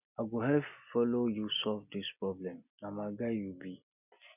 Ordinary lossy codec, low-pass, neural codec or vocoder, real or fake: none; 3.6 kHz; none; real